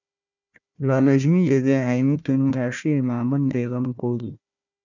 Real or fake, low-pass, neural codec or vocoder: fake; 7.2 kHz; codec, 16 kHz, 1 kbps, FunCodec, trained on Chinese and English, 50 frames a second